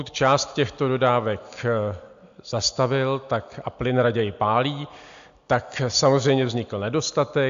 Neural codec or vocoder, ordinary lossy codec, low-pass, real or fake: none; MP3, 64 kbps; 7.2 kHz; real